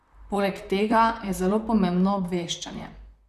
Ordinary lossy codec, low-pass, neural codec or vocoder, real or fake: none; 14.4 kHz; vocoder, 44.1 kHz, 128 mel bands, Pupu-Vocoder; fake